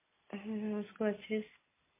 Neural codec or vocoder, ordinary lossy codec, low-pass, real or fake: none; MP3, 16 kbps; 3.6 kHz; real